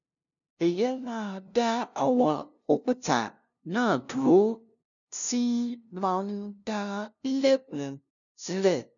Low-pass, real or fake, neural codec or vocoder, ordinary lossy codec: 7.2 kHz; fake; codec, 16 kHz, 0.5 kbps, FunCodec, trained on LibriTTS, 25 frames a second; none